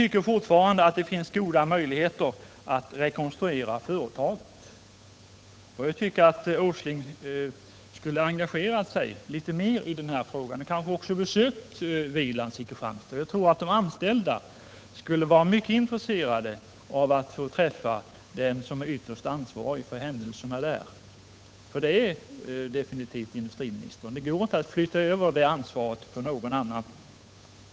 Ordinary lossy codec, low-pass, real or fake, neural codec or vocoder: none; none; fake; codec, 16 kHz, 8 kbps, FunCodec, trained on Chinese and English, 25 frames a second